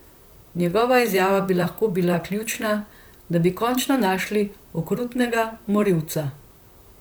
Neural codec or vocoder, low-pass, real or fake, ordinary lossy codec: vocoder, 44.1 kHz, 128 mel bands, Pupu-Vocoder; none; fake; none